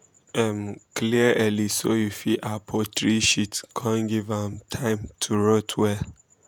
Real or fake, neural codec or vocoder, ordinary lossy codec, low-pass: fake; vocoder, 48 kHz, 128 mel bands, Vocos; none; 19.8 kHz